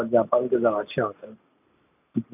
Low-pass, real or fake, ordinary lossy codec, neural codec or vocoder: 3.6 kHz; real; none; none